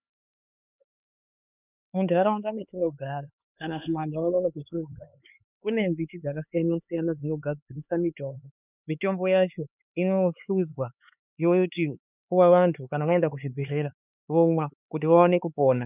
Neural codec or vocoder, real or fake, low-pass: codec, 16 kHz, 4 kbps, X-Codec, HuBERT features, trained on LibriSpeech; fake; 3.6 kHz